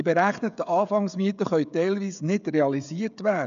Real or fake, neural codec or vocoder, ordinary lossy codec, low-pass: fake; codec, 16 kHz, 16 kbps, FreqCodec, smaller model; AAC, 96 kbps; 7.2 kHz